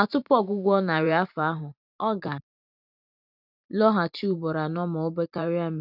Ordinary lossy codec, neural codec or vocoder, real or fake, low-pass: none; vocoder, 44.1 kHz, 128 mel bands every 512 samples, BigVGAN v2; fake; 5.4 kHz